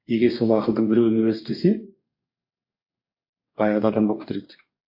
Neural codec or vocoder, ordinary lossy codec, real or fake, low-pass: codec, 44.1 kHz, 2.6 kbps, DAC; MP3, 32 kbps; fake; 5.4 kHz